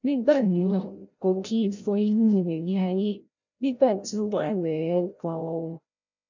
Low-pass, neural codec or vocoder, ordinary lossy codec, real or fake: 7.2 kHz; codec, 16 kHz, 0.5 kbps, FreqCodec, larger model; none; fake